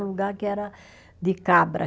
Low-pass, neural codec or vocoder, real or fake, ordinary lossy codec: none; none; real; none